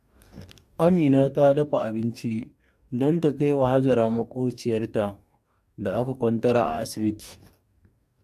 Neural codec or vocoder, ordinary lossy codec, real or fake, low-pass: codec, 44.1 kHz, 2.6 kbps, DAC; AAC, 96 kbps; fake; 14.4 kHz